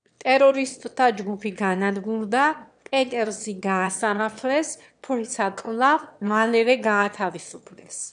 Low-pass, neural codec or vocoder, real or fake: 9.9 kHz; autoencoder, 22.05 kHz, a latent of 192 numbers a frame, VITS, trained on one speaker; fake